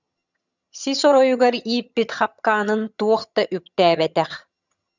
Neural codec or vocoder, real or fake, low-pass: vocoder, 22.05 kHz, 80 mel bands, HiFi-GAN; fake; 7.2 kHz